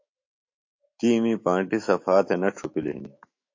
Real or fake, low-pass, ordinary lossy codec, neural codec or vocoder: fake; 7.2 kHz; MP3, 32 kbps; autoencoder, 48 kHz, 128 numbers a frame, DAC-VAE, trained on Japanese speech